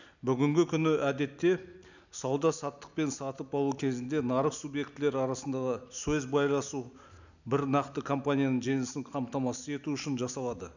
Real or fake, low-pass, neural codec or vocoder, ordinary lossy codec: real; 7.2 kHz; none; none